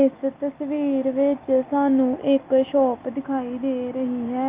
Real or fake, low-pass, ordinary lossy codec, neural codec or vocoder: real; 3.6 kHz; Opus, 24 kbps; none